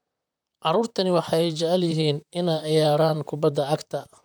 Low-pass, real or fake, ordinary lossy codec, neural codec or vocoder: none; fake; none; vocoder, 44.1 kHz, 128 mel bands, Pupu-Vocoder